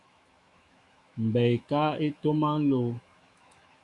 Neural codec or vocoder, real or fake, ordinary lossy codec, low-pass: autoencoder, 48 kHz, 128 numbers a frame, DAC-VAE, trained on Japanese speech; fake; Opus, 64 kbps; 10.8 kHz